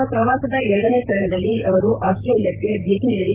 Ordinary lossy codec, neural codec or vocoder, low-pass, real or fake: Opus, 32 kbps; vocoder, 44.1 kHz, 128 mel bands, Pupu-Vocoder; 3.6 kHz; fake